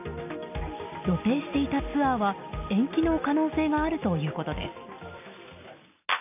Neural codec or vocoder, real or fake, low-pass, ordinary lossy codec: none; real; 3.6 kHz; none